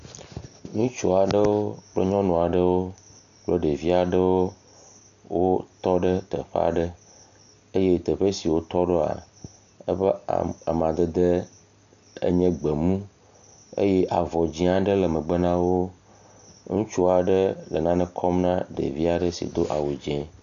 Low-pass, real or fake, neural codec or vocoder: 7.2 kHz; real; none